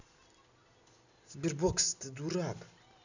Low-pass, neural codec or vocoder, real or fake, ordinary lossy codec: 7.2 kHz; none; real; none